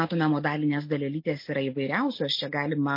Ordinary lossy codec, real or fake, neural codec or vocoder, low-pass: MP3, 32 kbps; real; none; 5.4 kHz